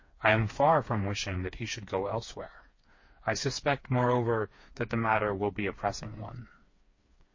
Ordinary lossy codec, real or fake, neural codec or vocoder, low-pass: MP3, 32 kbps; fake; codec, 16 kHz, 4 kbps, FreqCodec, smaller model; 7.2 kHz